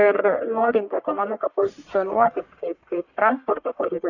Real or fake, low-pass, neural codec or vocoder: fake; 7.2 kHz; codec, 44.1 kHz, 1.7 kbps, Pupu-Codec